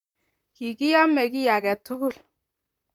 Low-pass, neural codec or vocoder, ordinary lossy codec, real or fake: 19.8 kHz; vocoder, 44.1 kHz, 128 mel bands, Pupu-Vocoder; none; fake